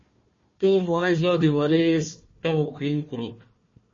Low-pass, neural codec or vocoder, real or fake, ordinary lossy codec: 7.2 kHz; codec, 16 kHz, 1 kbps, FunCodec, trained on Chinese and English, 50 frames a second; fake; MP3, 32 kbps